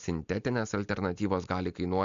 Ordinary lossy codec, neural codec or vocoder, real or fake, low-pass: MP3, 64 kbps; none; real; 7.2 kHz